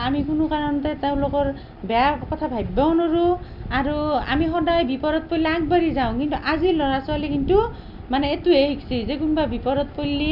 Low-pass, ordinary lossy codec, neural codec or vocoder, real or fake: 5.4 kHz; none; none; real